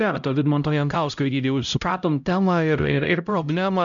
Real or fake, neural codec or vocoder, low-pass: fake; codec, 16 kHz, 0.5 kbps, X-Codec, HuBERT features, trained on LibriSpeech; 7.2 kHz